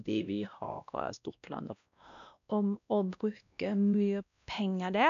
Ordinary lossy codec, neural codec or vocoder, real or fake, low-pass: none; codec, 16 kHz, 1 kbps, X-Codec, WavLM features, trained on Multilingual LibriSpeech; fake; 7.2 kHz